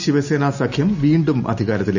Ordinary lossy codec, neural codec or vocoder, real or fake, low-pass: none; none; real; 7.2 kHz